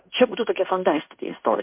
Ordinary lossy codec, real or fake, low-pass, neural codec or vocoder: MP3, 32 kbps; fake; 3.6 kHz; codec, 16 kHz in and 24 kHz out, 0.9 kbps, LongCat-Audio-Codec, fine tuned four codebook decoder